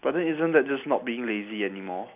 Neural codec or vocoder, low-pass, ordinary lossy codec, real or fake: none; 3.6 kHz; none; real